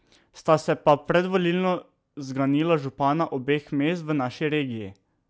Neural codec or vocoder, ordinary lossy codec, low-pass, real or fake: none; none; none; real